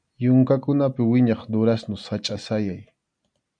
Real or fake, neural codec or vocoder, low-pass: real; none; 9.9 kHz